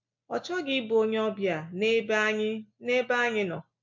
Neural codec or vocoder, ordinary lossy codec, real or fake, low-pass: none; MP3, 48 kbps; real; 7.2 kHz